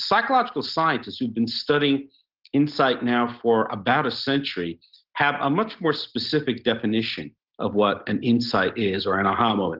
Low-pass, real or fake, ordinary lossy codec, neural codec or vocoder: 5.4 kHz; real; Opus, 16 kbps; none